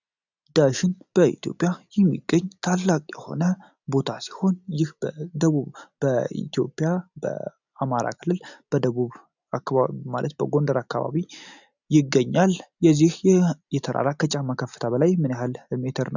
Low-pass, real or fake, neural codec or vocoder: 7.2 kHz; real; none